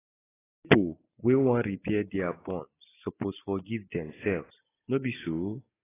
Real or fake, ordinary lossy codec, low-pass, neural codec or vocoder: real; AAC, 16 kbps; 3.6 kHz; none